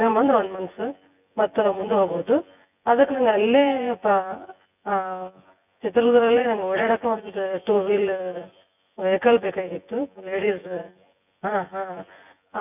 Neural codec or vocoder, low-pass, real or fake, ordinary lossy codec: vocoder, 24 kHz, 100 mel bands, Vocos; 3.6 kHz; fake; none